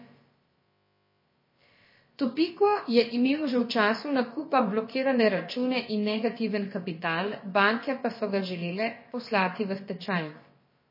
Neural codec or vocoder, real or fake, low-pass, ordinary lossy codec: codec, 16 kHz, about 1 kbps, DyCAST, with the encoder's durations; fake; 5.4 kHz; MP3, 24 kbps